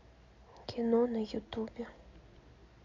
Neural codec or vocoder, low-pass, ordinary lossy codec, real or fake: none; 7.2 kHz; none; real